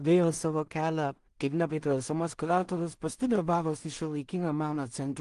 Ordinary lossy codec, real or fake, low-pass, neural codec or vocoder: Opus, 24 kbps; fake; 10.8 kHz; codec, 16 kHz in and 24 kHz out, 0.4 kbps, LongCat-Audio-Codec, two codebook decoder